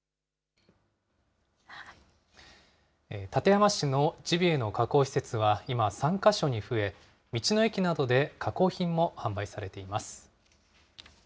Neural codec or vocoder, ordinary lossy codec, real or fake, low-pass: none; none; real; none